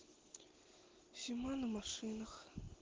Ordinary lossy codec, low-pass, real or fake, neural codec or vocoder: Opus, 16 kbps; 7.2 kHz; real; none